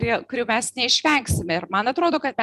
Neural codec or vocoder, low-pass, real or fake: none; 14.4 kHz; real